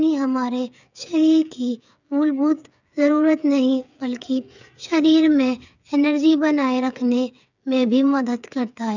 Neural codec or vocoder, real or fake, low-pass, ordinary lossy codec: codec, 16 kHz, 8 kbps, FreqCodec, smaller model; fake; 7.2 kHz; none